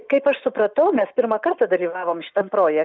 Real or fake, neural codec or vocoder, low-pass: real; none; 7.2 kHz